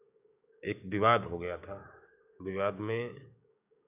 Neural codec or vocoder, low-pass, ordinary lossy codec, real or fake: codec, 16 kHz, 6 kbps, DAC; 3.6 kHz; AAC, 32 kbps; fake